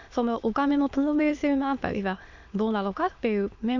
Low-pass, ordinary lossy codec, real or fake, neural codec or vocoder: 7.2 kHz; MP3, 64 kbps; fake; autoencoder, 22.05 kHz, a latent of 192 numbers a frame, VITS, trained on many speakers